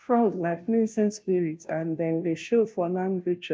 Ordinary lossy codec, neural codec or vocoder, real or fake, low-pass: none; codec, 16 kHz, 0.5 kbps, FunCodec, trained on Chinese and English, 25 frames a second; fake; none